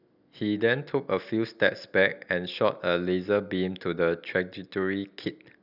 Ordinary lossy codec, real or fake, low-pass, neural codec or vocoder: none; real; 5.4 kHz; none